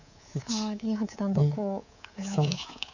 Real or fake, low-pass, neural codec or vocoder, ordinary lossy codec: fake; 7.2 kHz; codec, 24 kHz, 3.1 kbps, DualCodec; none